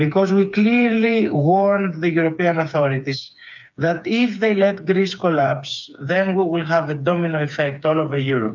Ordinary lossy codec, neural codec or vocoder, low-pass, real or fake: MP3, 64 kbps; codec, 16 kHz, 4 kbps, FreqCodec, smaller model; 7.2 kHz; fake